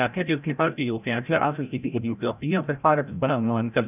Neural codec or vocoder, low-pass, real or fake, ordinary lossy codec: codec, 16 kHz, 0.5 kbps, FreqCodec, larger model; 3.6 kHz; fake; none